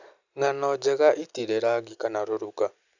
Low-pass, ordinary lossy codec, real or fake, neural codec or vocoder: 7.2 kHz; none; real; none